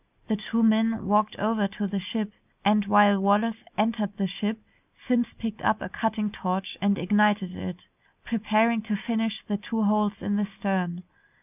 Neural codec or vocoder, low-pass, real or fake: none; 3.6 kHz; real